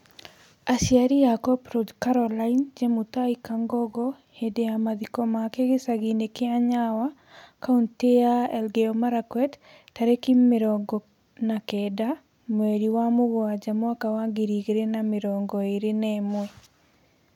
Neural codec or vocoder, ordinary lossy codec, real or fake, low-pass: none; none; real; 19.8 kHz